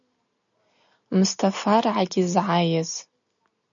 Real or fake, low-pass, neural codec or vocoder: real; 7.2 kHz; none